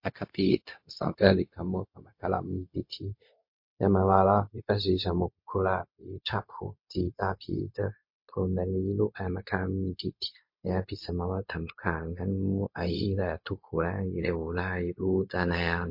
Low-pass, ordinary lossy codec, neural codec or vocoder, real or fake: 5.4 kHz; MP3, 32 kbps; codec, 16 kHz, 0.4 kbps, LongCat-Audio-Codec; fake